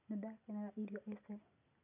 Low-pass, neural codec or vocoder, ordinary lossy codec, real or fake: 3.6 kHz; none; none; real